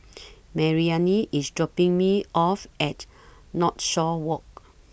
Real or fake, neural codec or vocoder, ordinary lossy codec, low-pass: real; none; none; none